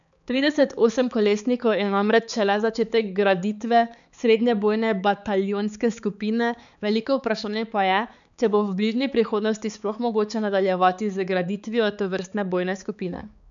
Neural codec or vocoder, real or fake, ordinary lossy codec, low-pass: codec, 16 kHz, 4 kbps, X-Codec, HuBERT features, trained on balanced general audio; fake; none; 7.2 kHz